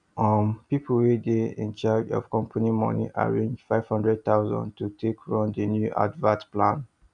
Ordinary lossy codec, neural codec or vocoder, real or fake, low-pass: none; none; real; 9.9 kHz